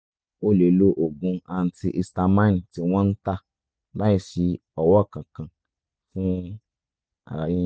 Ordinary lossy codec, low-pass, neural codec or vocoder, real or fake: none; none; none; real